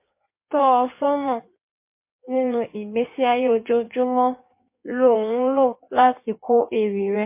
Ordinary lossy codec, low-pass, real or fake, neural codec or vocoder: MP3, 24 kbps; 3.6 kHz; fake; codec, 16 kHz in and 24 kHz out, 1.1 kbps, FireRedTTS-2 codec